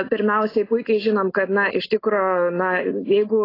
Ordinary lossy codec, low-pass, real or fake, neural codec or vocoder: AAC, 24 kbps; 5.4 kHz; real; none